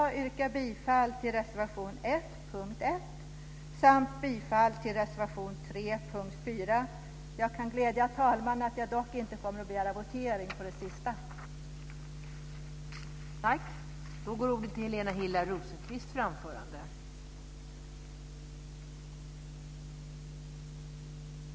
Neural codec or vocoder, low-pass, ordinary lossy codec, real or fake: none; none; none; real